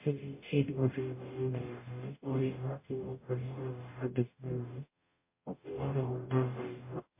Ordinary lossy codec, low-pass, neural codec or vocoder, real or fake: MP3, 24 kbps; 3.6 kHz; codec, 44.1 kHz, 0.9 kbps, DAC; fake